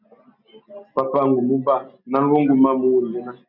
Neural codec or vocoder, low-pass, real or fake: none; 5.4 kHz; real